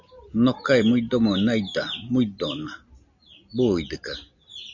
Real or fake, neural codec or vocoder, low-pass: real; none; 7.2 kHz